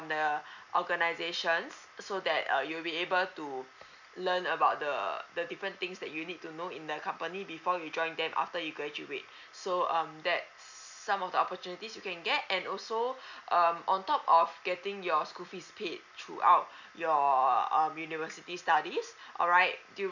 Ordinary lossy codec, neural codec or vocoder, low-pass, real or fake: none; none; 7.2 kHz; real